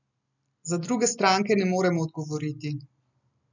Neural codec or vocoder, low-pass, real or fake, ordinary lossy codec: none; 7.2 kHz; real; none